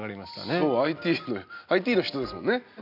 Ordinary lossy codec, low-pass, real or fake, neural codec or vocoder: none; 5.4 kHz; real; none